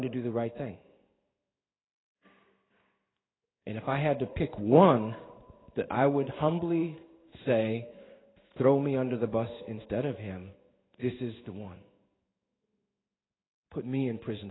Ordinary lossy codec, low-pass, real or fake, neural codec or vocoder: AAC, 16 kbps; 7.2 kHz; fake; codec, 16 kHz in and 24 kHz out, 1 kbps, XY-Tokenizer